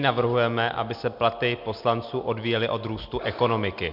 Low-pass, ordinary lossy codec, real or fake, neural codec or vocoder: 5.4 kHz; MP3, 48 kbps; real; none